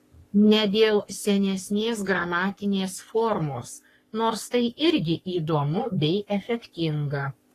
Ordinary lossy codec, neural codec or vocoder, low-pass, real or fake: AAC, 48 kbps; codec, 44.1 kHz, 3.4 kbps, Pupu-Codec; 14.4 kHz; fake